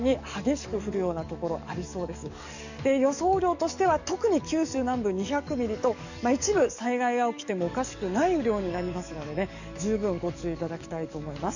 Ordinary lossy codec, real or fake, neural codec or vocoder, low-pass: none; fake; codec, 44.1 kHz, 7.8 kbps, DAC; 7.2 kHz